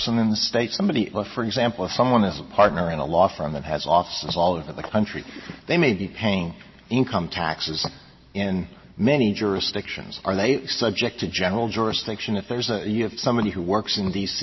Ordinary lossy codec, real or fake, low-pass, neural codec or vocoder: MP3, 24 kbps; real; 7.2 kHz; none